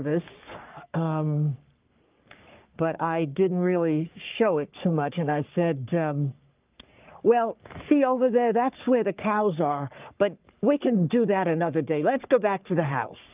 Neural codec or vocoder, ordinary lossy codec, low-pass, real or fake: codec, 44.1 kHz, 3.4 kbps, Pupu-Codec; Opus, 24 kbps; 3.6 kHz; fake